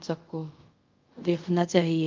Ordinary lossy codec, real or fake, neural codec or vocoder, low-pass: Opus, 24 kbps; fake; codec, 24 kHz, 0.5 kbps, DualCodec; 7.2 kHz